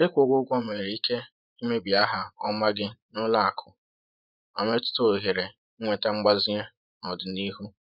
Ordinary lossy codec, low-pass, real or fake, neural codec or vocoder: none; 5.4 kHz; fake; vocoder, 24 kHz, 100 mel bands, Vocos